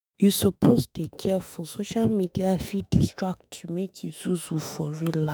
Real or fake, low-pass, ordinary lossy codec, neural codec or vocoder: fake; none; none; autoencoder, 48 kHz, 32 numbers a frame, DAC-VAE, trained on Japanese speech